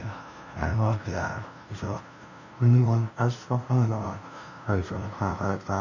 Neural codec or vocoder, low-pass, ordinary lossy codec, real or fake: codec, 16 kHz, 0.5 kbps, FunCodec, trained on LibriTTS, 25 frames a second; 7.2 kHz; none; fake